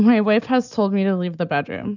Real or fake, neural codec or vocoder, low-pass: real; none; 7.2 kHz